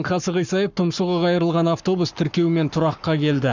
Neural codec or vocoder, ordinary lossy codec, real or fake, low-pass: codec, 44.1 kHz, 7.8 kbps, Pupu-Codec; none; fake; 7.2 kHz